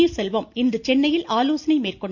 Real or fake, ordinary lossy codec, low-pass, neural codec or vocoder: real; MP3, 48 kbps; 7.2 kHz; none